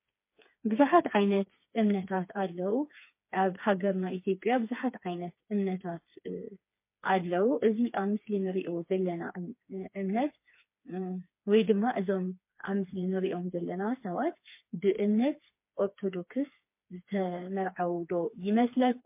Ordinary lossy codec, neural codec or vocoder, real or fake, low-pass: MP3, 24 kbps; codec, 16 kHz, 4 kbps, FreqCodec, smaller model; fake; 3.6 kHz